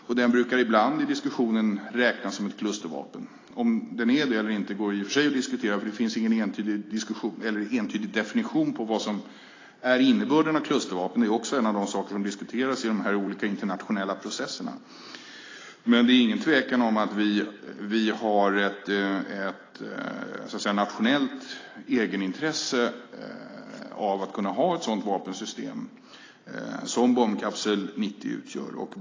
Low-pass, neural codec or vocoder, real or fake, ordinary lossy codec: 7.2 kHz; none; real; AAC, 32 kbps